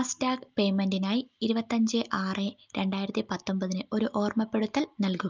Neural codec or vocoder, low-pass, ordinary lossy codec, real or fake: none; 7.2 kHz; Opus, 24 kbps; real